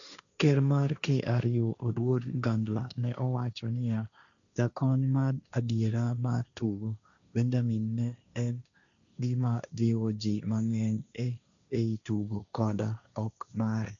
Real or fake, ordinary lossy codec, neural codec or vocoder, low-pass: fake; none; codec, 16 kHz, 1.1 kbps, Voila-Tokenizer; 7.2 kHz